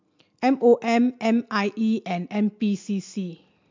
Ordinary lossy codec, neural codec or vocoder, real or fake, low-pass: MP3, 64 kbps; none; real; 7.2 kHz